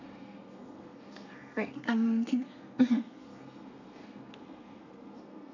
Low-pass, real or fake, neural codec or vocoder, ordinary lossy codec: 7.2 kHz; fake; codec, 32 kHz, 1.9 kbps, SNAC; none